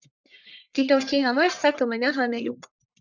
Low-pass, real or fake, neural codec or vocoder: 7.2 kHz; fake; codec, 44.1 kHz, 1.7 kbps, Pupu-Codec